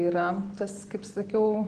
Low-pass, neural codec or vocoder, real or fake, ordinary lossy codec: 9.9 kHz; none; real; Opus, 16 kbps